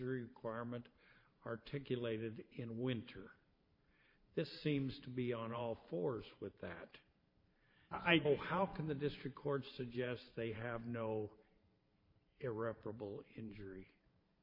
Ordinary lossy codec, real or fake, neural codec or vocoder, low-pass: MP3, 32 kbps; fake; vocoder, 44.1 kHz, 128 mel bands every 512 samples, BigVGAN v2; 5.4 kHz